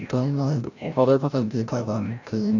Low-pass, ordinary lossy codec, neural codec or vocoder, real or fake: 7.2 kHz; none; codec, 16 kHz, 0.5 kbps, FreqCodec, larger model; fake